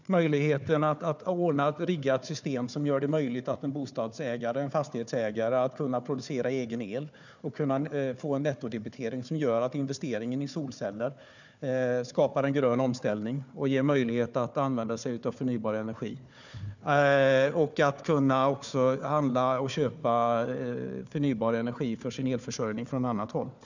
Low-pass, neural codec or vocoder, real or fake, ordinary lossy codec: 7.2 kHz; codec, 16 kHz, 4 kbps, FunCodec, trained on Chinese and English, 50 frames a second; fake; none